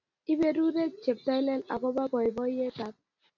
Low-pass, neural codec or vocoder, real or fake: 7.2 kHz; none; real